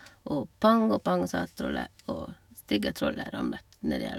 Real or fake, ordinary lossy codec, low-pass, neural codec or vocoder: fake; none; 19.8 kHz; autoencoder, 48 kHz, 128 numbers a frame, DAC-VAE, trained on Japanese speech